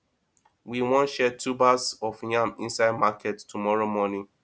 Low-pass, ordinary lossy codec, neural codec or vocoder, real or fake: none; none; none; real